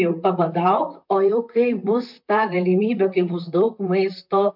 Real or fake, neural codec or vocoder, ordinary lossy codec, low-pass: fake; vocoder, 44.1 kHz, 128 mel bands, Pupu-Vocoder; MP3, 48 kbps; 5.4 kHz